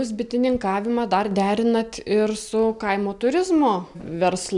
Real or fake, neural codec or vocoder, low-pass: real; none; 10.8 kHz